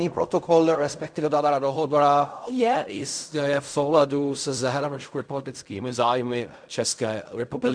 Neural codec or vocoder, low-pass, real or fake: codec, 16 kHz in and 24 kHz out, 0.4 kbps, LongCat-Audio-Codec, fine tuned four codebook decoder; 9.9 kHz; fake